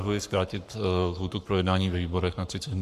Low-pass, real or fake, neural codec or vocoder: 14.4 kHz; fake; codec, 44.1 kHz, 7.8 kbps, Pupu-Codec